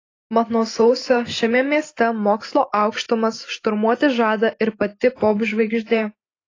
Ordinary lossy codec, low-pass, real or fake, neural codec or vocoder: AAC, 32 kbps; 7.2 kHz; real; none